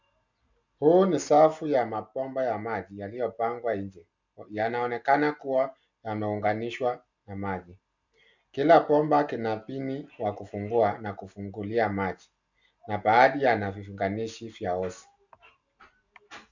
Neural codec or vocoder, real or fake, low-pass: none; real; 7.2 kHz